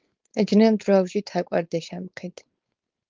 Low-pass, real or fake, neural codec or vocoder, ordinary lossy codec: 7.2 kHz; fake; codec, 16 kHz, 4.8 kbps, FACodec; Opus, 32 kbps